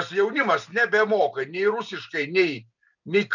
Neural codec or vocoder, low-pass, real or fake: none; 7.2 kHz; real